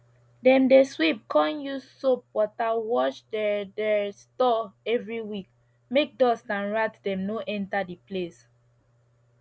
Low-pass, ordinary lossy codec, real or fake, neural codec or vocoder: none; none; real; none